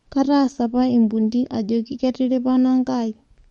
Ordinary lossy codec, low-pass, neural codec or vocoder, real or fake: MP3, 48 kbps; 19.8 kHz; codec, 44.1 kHz, 7.8 kbps, DAC; fake